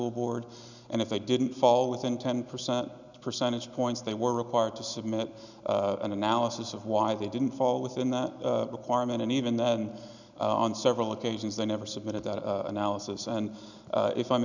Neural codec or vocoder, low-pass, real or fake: none; 7.2 kHz; real